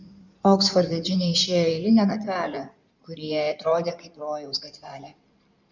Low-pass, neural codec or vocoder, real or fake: 7.2 kHz; codec, 16 kHz in and 24 kHz out, 2.2 kbps, FireRedTTS-2 codec; fake